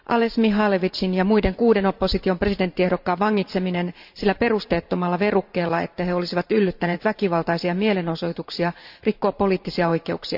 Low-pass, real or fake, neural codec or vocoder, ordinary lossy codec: 5.4 kHz; fake; vocoder, 44.1 kHz, 128 mel bands every 512 samples, BigVGAN v2; none